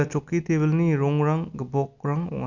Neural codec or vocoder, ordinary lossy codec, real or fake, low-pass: none; none; real; 7.2 kHz